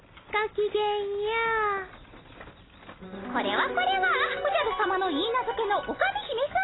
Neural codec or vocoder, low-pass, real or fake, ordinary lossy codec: none; 7.2 kHz; real; AAC, 16 kbps